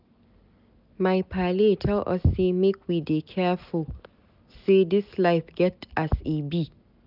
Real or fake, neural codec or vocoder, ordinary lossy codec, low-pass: real; none; none; 5.4 kHz